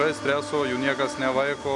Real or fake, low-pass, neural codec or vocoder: real; 10.8 kHz; none